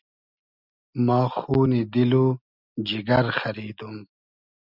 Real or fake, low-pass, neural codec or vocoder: real; 5.4 kHz; none